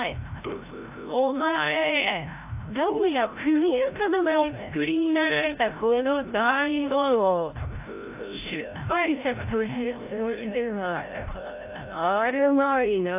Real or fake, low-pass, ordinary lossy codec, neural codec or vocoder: fake; 3.6 kHz; AAC, 32 kbps; codec, 16 kHz, 0.5 kbps, FreqCodec, larger model